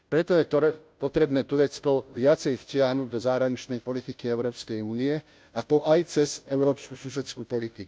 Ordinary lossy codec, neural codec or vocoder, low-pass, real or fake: none; codec, 16 kHz, 0.5 kbps, FunCodec, trained on Chinese and English, 25 frames a second; none; fake